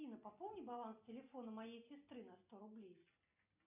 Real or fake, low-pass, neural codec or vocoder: real; 3.6 kHz; none